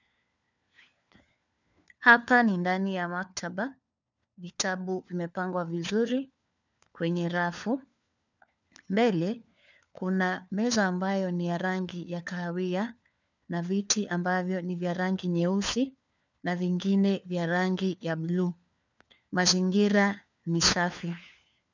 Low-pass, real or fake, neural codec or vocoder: 7.2 kHz; fake; codec, 16 kHz, 4 kbps, FunCodec, trained on LibriTTS, 50 frames a second